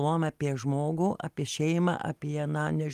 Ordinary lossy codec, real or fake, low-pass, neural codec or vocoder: Opus, 32 kbps; real; 14.4 kHz; none